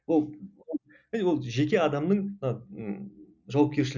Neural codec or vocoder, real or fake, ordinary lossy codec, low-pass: none; real; none; 7.2 kHz